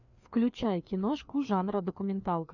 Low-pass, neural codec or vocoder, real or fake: 7.2 kHz; codec, 16 kHz, 2 kbps, FreqCodec, larger model; fake